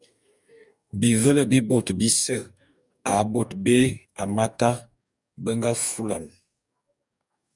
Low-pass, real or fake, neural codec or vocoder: 10.8 kHz; fake; codec, 44.1 kHz, 2.6 kbps, DAC